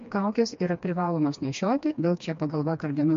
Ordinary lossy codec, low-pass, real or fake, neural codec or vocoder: MP3, 48 kbps; 7.2 kHz; fake; codec, 16 kHz, 2 kbps, FreqCodec, smaller model